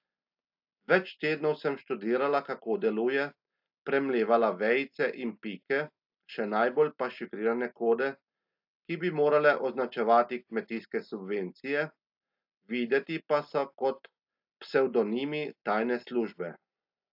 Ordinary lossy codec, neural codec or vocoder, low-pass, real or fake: AAC, 48 kbps; none; 5.4 kHz; real